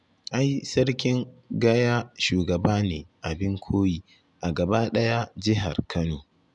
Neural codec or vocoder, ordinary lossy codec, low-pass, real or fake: none; none; 10.8 kHz; real